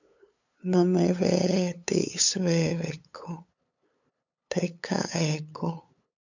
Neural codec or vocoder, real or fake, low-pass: codec, 16 kHz, 8 kbps, FunCodec, trained on LibriTTS, 25 frames a second; fake; 7.2 kHz